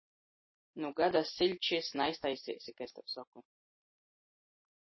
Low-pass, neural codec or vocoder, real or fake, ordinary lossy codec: 7.2 kHz; none; real; MP3, 24 kbps